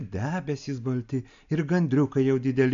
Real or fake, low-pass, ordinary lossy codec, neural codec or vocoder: real; 7.2 kHz; MP3, 64 kbps; none